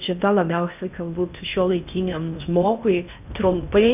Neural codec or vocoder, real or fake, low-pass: codec, 16 kHz in and 24 kHz out, 0.6 kbps, FocalCodec, streaming, 2048 codes; fake; 3.6 kHz